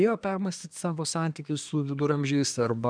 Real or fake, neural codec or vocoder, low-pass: fake; codec, 24 kHz, 1 kbps, SNAC; 9.9 kHz